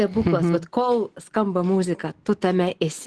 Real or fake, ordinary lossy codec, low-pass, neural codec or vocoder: real; Opus, 16 kbps; 10.8 kHz; none